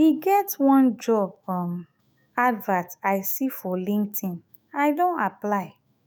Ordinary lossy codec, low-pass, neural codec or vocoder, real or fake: none; none; autoencoder, 48 kHz, 128 numbers a frame, DAC-VAE, trained on Japanese speech; fake